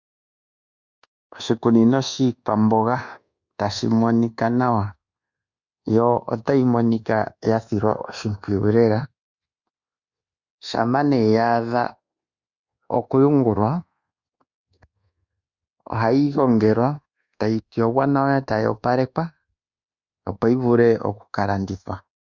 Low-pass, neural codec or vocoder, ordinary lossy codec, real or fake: 7.2 kHz; codec, 24 kHz, 1.2 kbps, DualCodec; Opus, 64 kbps; fake